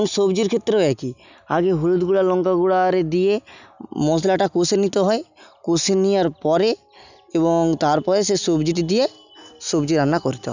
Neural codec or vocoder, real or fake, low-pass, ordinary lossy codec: none; real; 7.2 kHz; none